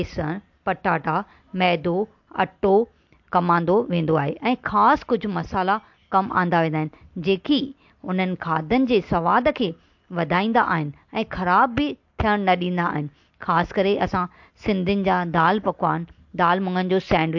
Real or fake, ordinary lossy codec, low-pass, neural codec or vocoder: real; MP3, 48 kbps; 7.2 kHz; none